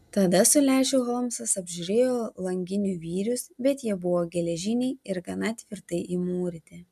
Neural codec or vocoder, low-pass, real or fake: none; 14.4 kHz; real